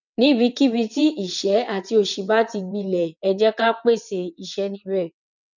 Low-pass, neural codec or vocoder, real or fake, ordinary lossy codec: 7.2 kHz; vocoder, 22.05 kHz, 80 mel bands, WaveNeXt; fake; none